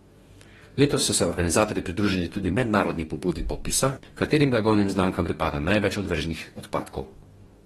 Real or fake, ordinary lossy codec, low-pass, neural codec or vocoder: fake; AAC, 32 kbps; 19.8 kHz; codec, 44.1 kHz, 2.6 kbps, DAC